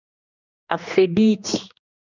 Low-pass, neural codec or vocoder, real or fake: 7.2 kHz; codec, 16 kHz, 2 kbps, X-Codec, HuBERT features, trained on general audio; fake